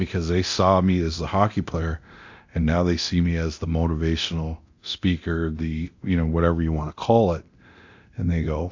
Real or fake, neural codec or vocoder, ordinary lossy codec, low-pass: fake; codec, 24 kHz, 0.9 kbps, DualCodec; AAC, 48 kbps; 7.2 kHz